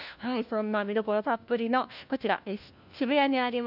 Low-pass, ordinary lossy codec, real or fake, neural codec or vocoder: 5.4 kHz; none; fake; codec, 16 kHz, 1 kbps, FunCodec, trained on LibriTTS, 50 frames a second